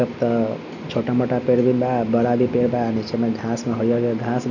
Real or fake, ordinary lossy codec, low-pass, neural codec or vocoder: real; none; 7.2 kHz; none